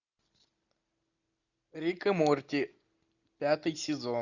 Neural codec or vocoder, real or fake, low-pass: none; real; 7.2 kHz